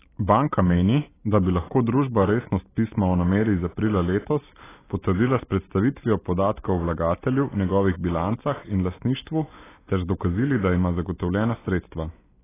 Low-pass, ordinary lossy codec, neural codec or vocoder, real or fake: 3.6 kHz; AAC, 16 kbps; none; real